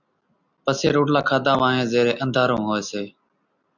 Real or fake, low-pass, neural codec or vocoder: real; 7.2 kHz; none